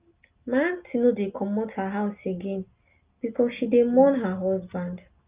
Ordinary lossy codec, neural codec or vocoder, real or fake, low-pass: Opus, 24 kbps; none; real; 3.6 kHz